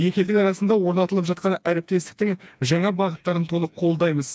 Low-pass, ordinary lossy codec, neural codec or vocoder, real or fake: none; none; codec, 16 kHz, 2 kbps, FreqCodec, smaller model; fake